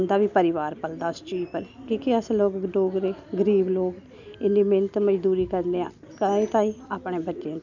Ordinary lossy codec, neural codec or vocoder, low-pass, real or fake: none; none; 7.2 kHz; real